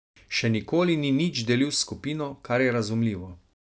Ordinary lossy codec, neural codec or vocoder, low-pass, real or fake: none; none; none; real